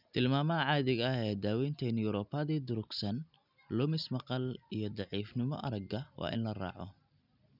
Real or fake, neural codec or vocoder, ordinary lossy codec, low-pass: real; none; none; 5.4 kHz